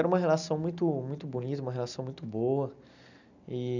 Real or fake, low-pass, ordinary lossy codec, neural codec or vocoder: real; 7.2 kHz; none; none